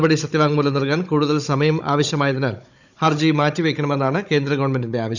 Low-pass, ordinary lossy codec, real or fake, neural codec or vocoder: 7.2 kHz; none; fake; codec, 16 kHz, 16 kbps, FunCodec, trained on Chinese and English, 50 frames a second